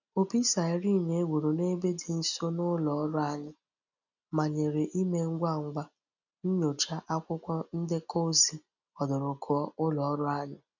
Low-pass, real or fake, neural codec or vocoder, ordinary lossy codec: 7.2 kHz; real; none; none